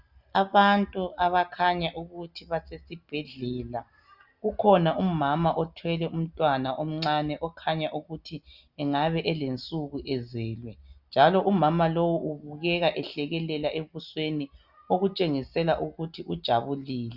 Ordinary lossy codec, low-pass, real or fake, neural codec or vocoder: Opus, 64 kbps; 5.4 kHz; real; none